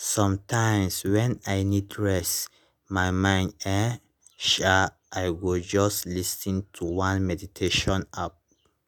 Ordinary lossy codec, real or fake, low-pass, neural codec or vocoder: none; real; none; none